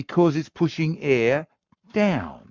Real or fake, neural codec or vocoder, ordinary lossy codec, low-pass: fake; codec, 44.1 kHz, 7.8 kbps, Pupu-Codec; MP3, 64 kbps; 7.2 kHz